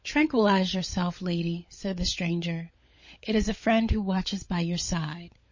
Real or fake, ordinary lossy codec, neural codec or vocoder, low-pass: fake; MP3, 32 kbps; codec, 16 kHz, 16 kbps, FunCodec, trained on LibriTTS, 50 frames a second; 7.2 kHz